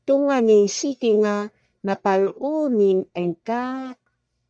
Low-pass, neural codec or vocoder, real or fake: 9.9 kHz; codec, 44.1 kHz, 1.7 kbps, Pupu-Codec; fake